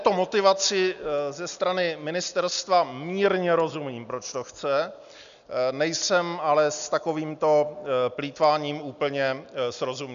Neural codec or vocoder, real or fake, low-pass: none; real; 7.2 kHz